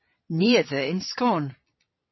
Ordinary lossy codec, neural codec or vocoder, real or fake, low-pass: MP3, 24 kbps; vocoder, 22.05 kHz, 80 mel bands, Vocos; fake; 7.2 kHz